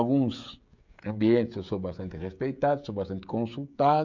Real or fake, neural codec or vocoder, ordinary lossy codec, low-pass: fake; codec, 16 kHz, 16 kbps, FreqCodec, smaller model; none; 7.2 kHz